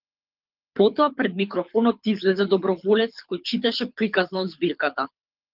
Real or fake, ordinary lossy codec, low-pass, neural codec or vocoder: fake; Opus, 24 kbps; 5.4 kHz; codec, 24 kHz, 6 kbps, HILCodec